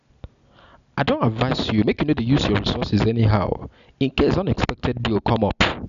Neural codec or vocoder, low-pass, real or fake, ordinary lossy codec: none; 7.2 kHz; real; none